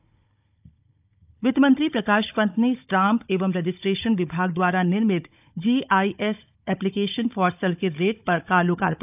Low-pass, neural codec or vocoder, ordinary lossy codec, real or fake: 3.6 kHz; codec, 16 kHz, 16 kbps, FunCodec, trained on Chinese and English, 50 frames a second; AAC, 32 kbps; fake